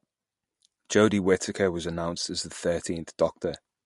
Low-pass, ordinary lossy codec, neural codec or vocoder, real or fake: 14.4 kHz; MP3, 48 kbps; none; real